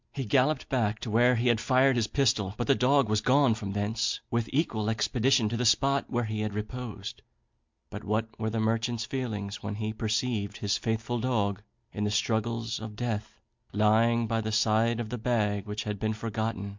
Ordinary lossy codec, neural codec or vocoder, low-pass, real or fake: MP3, 64 kbps; none; 7.2 kHz; real